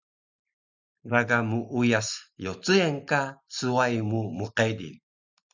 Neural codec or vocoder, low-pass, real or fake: none; 7.2 kHz; real